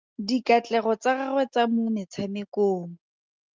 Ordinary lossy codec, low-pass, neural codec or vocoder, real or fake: Opus, 32 kbps; 7.2 kHz; none; real